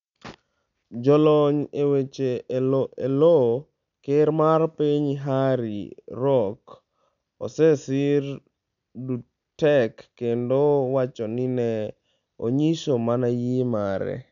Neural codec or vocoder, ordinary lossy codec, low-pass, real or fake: none; none; 7.2 kHz; real